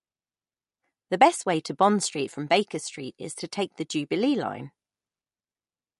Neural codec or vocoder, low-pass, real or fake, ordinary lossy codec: none; 14.4 kHz; real; MP3, 48 kbps